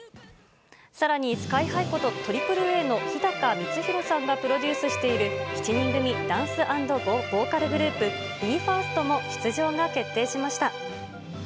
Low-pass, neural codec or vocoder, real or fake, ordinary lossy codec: none; none; real; none